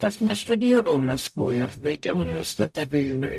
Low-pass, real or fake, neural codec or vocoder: 14.4 kHz; fake; codec, 44.1 kHz, 0.9 kbps, DAC